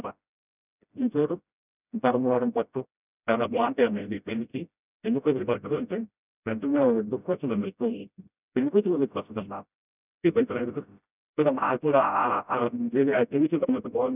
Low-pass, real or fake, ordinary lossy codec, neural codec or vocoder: 3.6 kHz; fake; none; codec, 16 kHz, 0.5 kbps, FreqCodec, smaller model